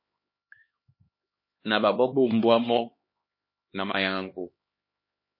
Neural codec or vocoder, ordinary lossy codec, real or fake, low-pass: codec, 16 kHz, 2 kbps, X-Codec, HuBERT features, trained on LibriSpeech; MP3, 24 kbps; fake; 5.4 kHz